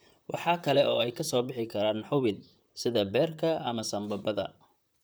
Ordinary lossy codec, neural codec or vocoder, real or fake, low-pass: none; vocoder, 44.1 kHz, 128 mel bands, Pupu-Vocoder; fake; none